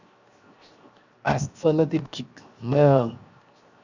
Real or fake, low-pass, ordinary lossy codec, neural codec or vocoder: fake; 7.2 kHz; Opus, 64 kbps; codec, 16 kHz, 0.7 kbps, FocalCodec